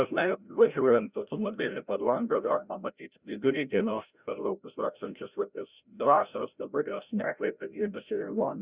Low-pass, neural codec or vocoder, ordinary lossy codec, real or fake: 3.6 kHz; codec, 16 kHz, 0.5 kbps, FreqCodec, larger model; Opus, 64 kbps; fake